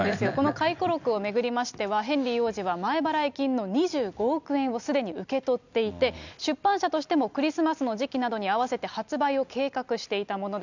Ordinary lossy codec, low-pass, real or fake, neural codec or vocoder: none; 7.2 kHz; real; none